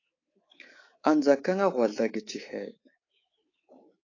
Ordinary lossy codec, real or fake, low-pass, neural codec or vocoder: AAC, 32 kbps; fake; 7.2 kHz; codec, 24 kHz, 3.1 kbps, DualCodec